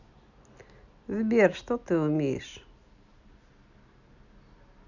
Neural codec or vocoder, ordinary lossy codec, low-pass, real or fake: none; none; 7.2 kHz; real